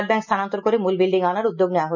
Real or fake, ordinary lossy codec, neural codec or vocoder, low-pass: real; none; none; 7.2 kHz